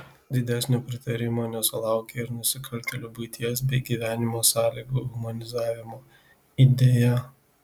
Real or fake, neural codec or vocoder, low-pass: real; none; 19.8 kHz